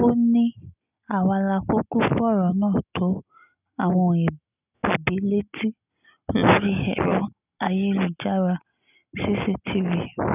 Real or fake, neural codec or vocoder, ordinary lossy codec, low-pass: real; none; none; 3.6 kHz